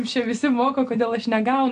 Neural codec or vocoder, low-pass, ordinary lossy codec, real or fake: none; 9.9 kHz; AAC, 64 kbps; real